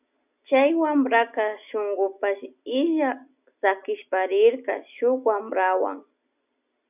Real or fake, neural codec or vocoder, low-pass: real; none; 3.6 kHz